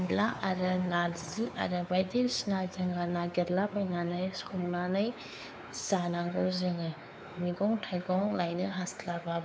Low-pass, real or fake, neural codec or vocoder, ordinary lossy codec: none; fake; codec, 16 kHz, 4 kbps, X-Codec, WavLM features, trained on Multilingual LibriSpeech; none